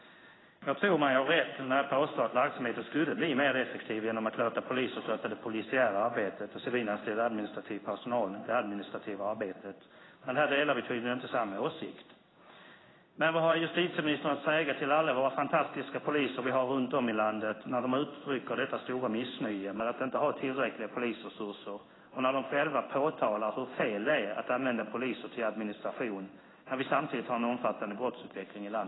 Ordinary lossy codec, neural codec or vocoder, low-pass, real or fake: AAC, 16 kbps; codec, 16 kHz in and 24 kHz out, 1 kbps, XY-Tokenizer; 7.2 kHz; fake